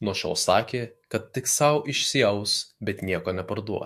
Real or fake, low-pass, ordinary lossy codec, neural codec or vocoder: fake; 14.4 kHz; MP3, 64 kbps; autoencoder, 48 kHz, 128 numbers a frame, DAC-VAE, trained on Japanese speech